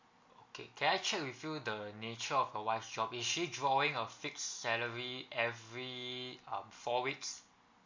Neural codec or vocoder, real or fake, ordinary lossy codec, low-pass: none; real; MP3, 48 kbps; 7.2 kHz